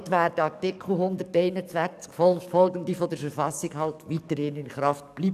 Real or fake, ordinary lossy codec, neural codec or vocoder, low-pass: fake; none; codec, 44.1 kHz, 7.8 kbps, DAC; 14.4 kHz